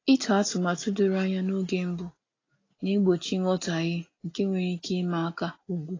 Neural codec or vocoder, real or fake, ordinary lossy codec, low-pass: none; real; AAC, 32 kbps; 7.2 kHz